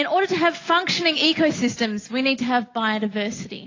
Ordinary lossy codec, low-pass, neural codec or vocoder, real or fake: AAC, 32 kbps; 7.2 kHz; none; real